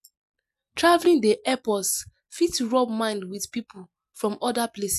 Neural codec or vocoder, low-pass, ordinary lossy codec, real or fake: none; 14.4 kHz; none; real